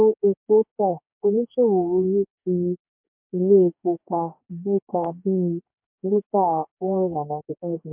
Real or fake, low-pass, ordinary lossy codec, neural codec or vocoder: fake; 3.6 kHz; MP3, 32 kbps; codec, 44.1 kHz, 2.6 kbps, DAC